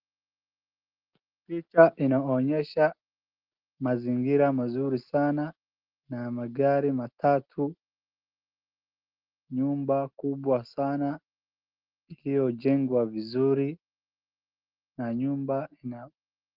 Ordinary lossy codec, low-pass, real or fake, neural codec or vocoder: Opus, 16 kbps; 5.4 kHz; real; none